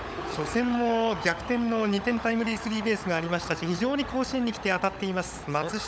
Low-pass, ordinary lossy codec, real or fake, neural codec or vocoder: none; none; fake; codec, 16 kHz, 16 kbps, FunCodec, trained on LibriTTS, 50 frames a second